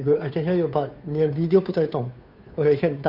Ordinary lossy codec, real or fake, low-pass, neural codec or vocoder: none; fake; 5.4 kHz; codec, 16 kHz, 2 kbps, FunCodec, trained on Chinese and English, 25 frames a second